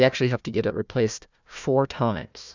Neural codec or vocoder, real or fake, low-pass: codec, 16 kHz, 1 kbps, FunCodec, trained on Chinese and English, 50 frames a second; fake; 7.2 kHz